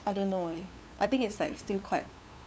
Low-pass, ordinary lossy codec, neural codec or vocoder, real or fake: none; none; codec, 16 kHz, 2 kbps, FunCodec, trained on LibriTTS, 25 frames a second; fake